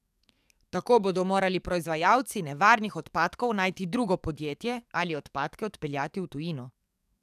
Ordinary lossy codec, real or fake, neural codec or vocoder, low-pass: none; fake; codec, 44.1 kHz, 7.8 kbps, DAC; 14.4 kHz